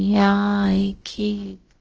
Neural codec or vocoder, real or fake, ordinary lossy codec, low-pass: codec, 16 kHz, about 1 kbps, DyCAST, with the encoder's durations; fake; Opus, 16 kbps; 7.2 kHz